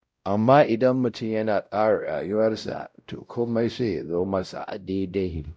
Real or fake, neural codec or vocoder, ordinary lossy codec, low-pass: fake; codec, 16 kHz, 0.5 kbps, X-Codec, WavLM features, trained on Multilingual LibriSpeech; none; none